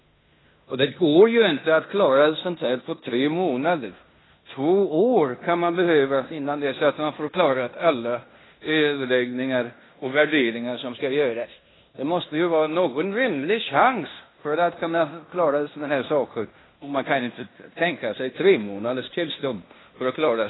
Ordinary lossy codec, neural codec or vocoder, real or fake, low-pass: AAC, 16 kbps; codec, 16 kHz in and 24 kHz out, 0.9 kbps, LongCat-Audio-Codec, fine tuned four codebook decoder; fake; 7.2 kHz